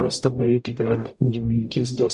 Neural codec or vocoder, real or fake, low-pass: codec, 44.1 kHz, 0.9 kbps, DAC; fake; 10.8 kHz